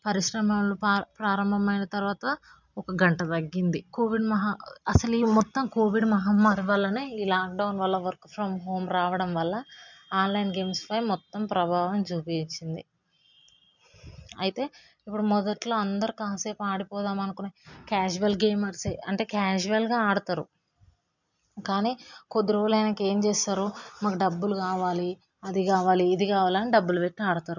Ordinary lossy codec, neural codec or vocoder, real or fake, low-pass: none; none; real; 7.2 kHz